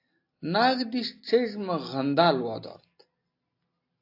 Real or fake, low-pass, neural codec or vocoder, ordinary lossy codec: real; 5.4 kHz; none; AAC, 32 kbps